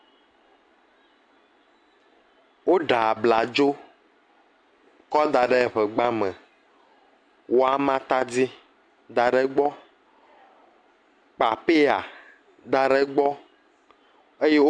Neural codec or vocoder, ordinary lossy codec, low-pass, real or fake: none; AAC, 64 kbps; 9.9 kHz; real